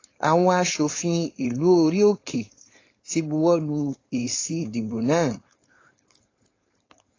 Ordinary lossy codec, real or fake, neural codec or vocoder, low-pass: AAC, 32 kbps; fake; codec, 16 kHz, 4.8 kbps, FACodec; 7.2 kHz